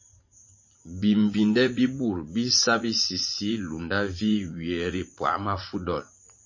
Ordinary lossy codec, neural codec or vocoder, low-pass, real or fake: MP3, 32 kbps; vocoder, 44.1 kHz, 80 mel bands, Vocos; 7.2 kHz; fake